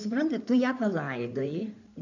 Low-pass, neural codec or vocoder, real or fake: 7.2 kHz; codec, 16 kHz in and 24 kHz out, 2.2 kbps, FireRedTTS-2 codec; fake